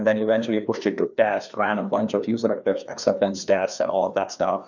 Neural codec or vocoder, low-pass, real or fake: codec, 16 kHz, 2 kbps, FreqCodec, larger model; 7.2 kHz; fake